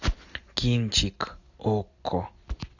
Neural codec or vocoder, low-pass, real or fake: none; 7.2 kHz; real